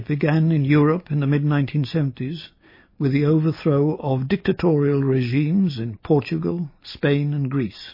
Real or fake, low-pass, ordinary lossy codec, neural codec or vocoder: real; 5.4 kHz; MP3, 24 kbps; none